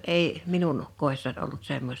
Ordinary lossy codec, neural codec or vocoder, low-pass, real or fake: none; none; 19.8 kHz; real